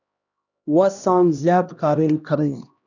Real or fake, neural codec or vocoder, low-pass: fake; codec, 16 kHz, 1 kbps, X-Codec, HuBERT features, trained on LibriSpeech; 7.2 kHz